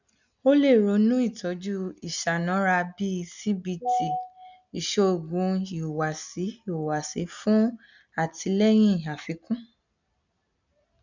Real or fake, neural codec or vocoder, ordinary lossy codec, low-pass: real; none; none; 7.2 kHz